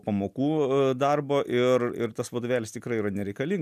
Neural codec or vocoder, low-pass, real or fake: none; 14.4 kHz; real